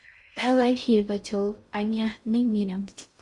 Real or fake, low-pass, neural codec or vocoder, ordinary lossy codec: fake; 10.8 kHz; codec, 16 kHz in and 24 kHz out, 0.6 kbps, FocalCodec, streaming, 4096 codes; Opus, 64 kbps